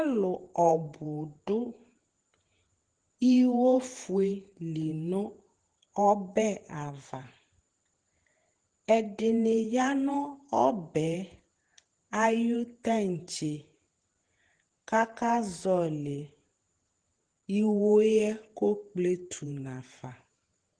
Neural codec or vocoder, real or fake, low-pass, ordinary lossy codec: vocoder, 48 kHz, 128 mel bands, Vocos; fake; 9.9 kHz; Opus, 16 kbps